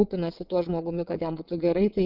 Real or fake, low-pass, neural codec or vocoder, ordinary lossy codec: fake; 5.4 kHz; vocoder, 22.05 kHz, 80 mel bands, WaveNeXt; Opus, 32 kbps